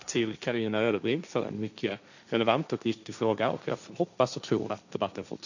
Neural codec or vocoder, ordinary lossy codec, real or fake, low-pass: codec, 16 kHz, 1.1 kbps, Voila-Tokenizer; none; fake; 7.2 kHz